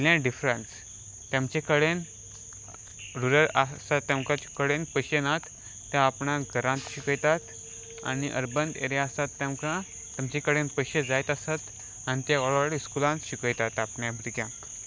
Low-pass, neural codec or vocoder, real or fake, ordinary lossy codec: none; none; real; none